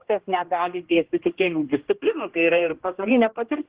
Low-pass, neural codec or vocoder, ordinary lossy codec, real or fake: 3.6 kHz; codec, 16 kHz, 1 kbps, X-Codec, HuBERT features, trained on general audio; Opus, 16 kbps; fake